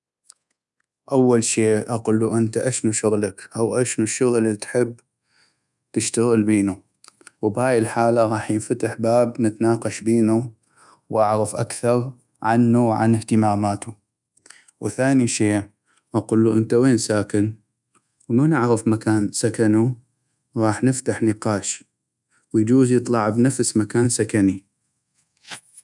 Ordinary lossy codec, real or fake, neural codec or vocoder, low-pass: none; fake; codec, 24 kHz, 1.2 kbps, DualCodec; 10.8 kHz